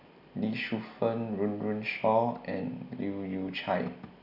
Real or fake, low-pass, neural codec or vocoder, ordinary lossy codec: real; 5.4 kHz; none; none